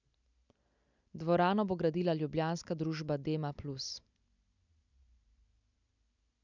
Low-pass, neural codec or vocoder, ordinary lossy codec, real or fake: 7.2 kHz; none; none; real